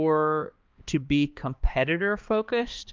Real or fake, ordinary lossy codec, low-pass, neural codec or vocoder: fake; Opus, 32 kbps; 7.2 kHz; codec, 16 kHz, 2 kbps, X-Codec, HuBERT features, trained on balanced general audio